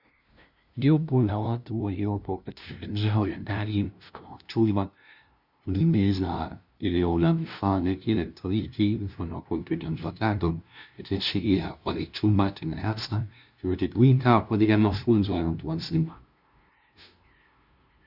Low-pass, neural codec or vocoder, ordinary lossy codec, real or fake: 5.4 kHz; codec, 16 kHz, 0.5 kbps, FunCodec, trained on LibriTTS, 25 frames a second; Opus, 64 kbps; fake